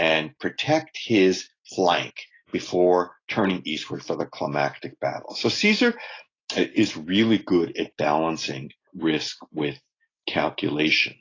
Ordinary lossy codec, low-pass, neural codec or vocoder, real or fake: AAC, 32 kbps; 7.2 kHz; none; real